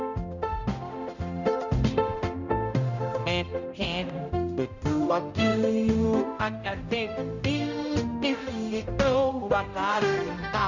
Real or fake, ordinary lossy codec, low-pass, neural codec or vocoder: fake; none; 7.2 kHz; codec, 16 kHz, 0.5 kbps, X-Codec, HuBERT features, trained on general audio